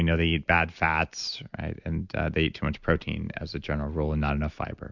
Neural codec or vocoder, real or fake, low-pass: none; real; 7.2 kHz